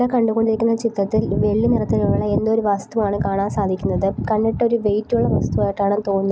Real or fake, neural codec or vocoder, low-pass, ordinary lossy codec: real; none; none; none